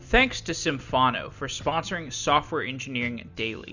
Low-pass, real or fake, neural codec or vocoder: 7.2 kHz; real; none